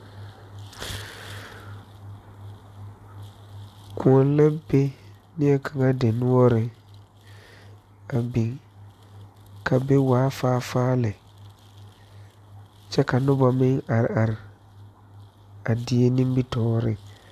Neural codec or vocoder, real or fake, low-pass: none; real; 14.4 kHz